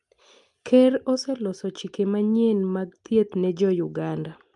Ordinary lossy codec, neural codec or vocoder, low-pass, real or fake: Opus, 32 kbps; none; 10.8 kHz; real